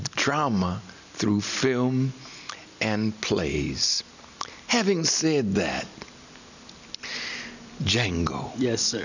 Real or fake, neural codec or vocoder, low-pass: real; none; 7.2 kHz